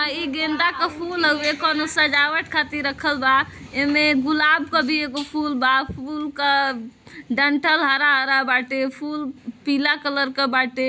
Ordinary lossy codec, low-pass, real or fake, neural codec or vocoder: none; none; real; none